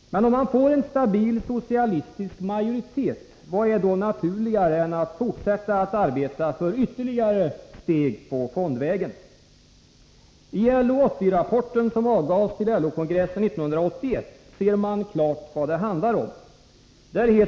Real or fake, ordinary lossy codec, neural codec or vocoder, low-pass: real; none; none; none